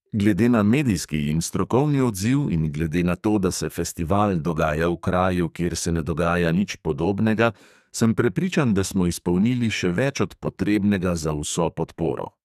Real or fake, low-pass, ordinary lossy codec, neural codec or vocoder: fake; 14.4 kHz; none; codec, 44.1 kHz, 2.6 kbps, SNAC